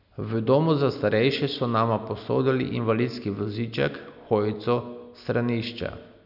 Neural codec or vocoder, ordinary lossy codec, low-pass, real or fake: none; none; 5.4 kHz; real